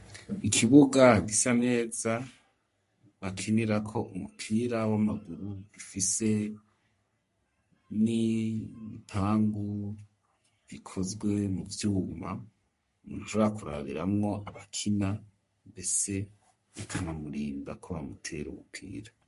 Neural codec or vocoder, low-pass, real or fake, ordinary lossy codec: codec, 44.1 kHz, 3.4 kbps, Pupu-Codec; 14.4 kHz; fake; MP3, 48 kbps